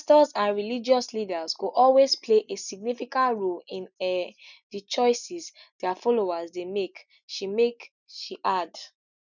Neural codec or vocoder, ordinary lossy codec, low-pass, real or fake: none; none; 7.2 kHz; real